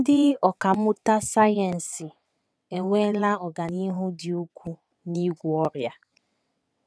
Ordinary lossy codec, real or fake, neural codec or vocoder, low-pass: none; fake; vocoder, 22.05 kHz, 80 mel bands, WaveNeXt; none